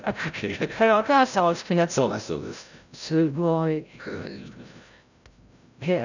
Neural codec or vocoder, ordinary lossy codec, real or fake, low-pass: codec, 16 kHz, 0.5 kbps, FreqCodec, larger model; none; fake; 7.2 kHz